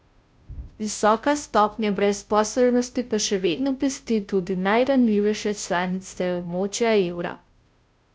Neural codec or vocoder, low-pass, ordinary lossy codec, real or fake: codec, 16 kHz, 0.5 kbps, FunCodec, trained on Chinese and English, 25 frames a second; none; none; fake